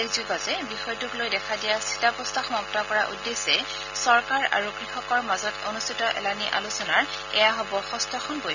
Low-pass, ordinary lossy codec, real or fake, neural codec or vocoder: 7.2 kHz; none; real; none